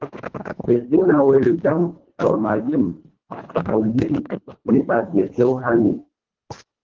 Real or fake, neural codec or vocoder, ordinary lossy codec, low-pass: fake; codec, 24 kHz, 1.5 kbps, HILCodec; Opus, 16 kbps; 7.2 kHz